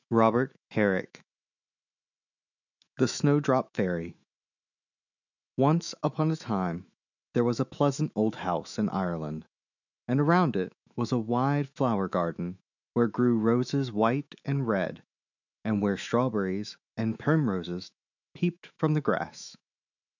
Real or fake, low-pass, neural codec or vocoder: fake; 7.2 kHz; autoencoder, 48 kHz, 128 numbers a frame, DAC-VAE, trained on Japanese speech